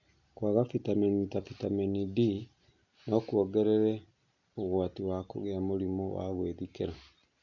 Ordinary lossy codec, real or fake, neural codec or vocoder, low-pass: none; real; none; 7.2 kHz